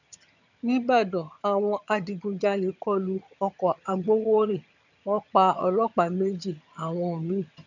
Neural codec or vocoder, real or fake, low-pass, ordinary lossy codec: vocoder, 22.05 kHz, 80 mel bands, HiFi-GAN; fake; 7.2 kHz; none